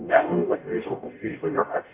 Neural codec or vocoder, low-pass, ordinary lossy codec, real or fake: codec, 44.1 kHz, 0.9 kbps, DAC; 3.6 kHz; MP3, 24 kbps; fake